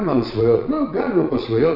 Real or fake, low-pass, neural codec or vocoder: fake; 5.4 kHz; vocoder, 22.05 kHz, 80 mel bands, Vocos